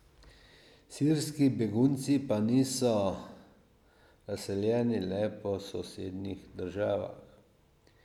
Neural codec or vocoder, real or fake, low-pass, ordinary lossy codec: none; real; 19.8 kHz; none